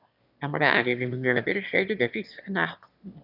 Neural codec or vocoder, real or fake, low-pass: autoencoder, 22.05 kHz, a latent of 192 numbers a frame, VITS, trained on one speaker; fake; 5.4 kHz